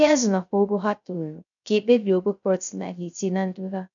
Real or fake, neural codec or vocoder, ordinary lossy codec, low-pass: fake; codec, 16 kHz, 0.3 kbps, FocalCodec; none; 7.2 kHz